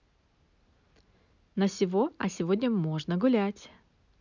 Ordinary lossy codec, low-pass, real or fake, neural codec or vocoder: none; 7.2 kHz; real; none